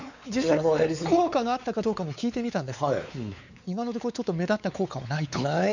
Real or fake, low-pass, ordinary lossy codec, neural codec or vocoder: fake; 7.2 kHz; none; codec, 16 kHz, 4 kbps, X-Codec, WavLM features, trained on Multilingual LibriSpeech